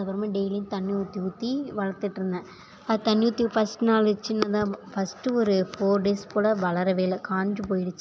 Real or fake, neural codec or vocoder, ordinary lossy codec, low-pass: real; none; none; none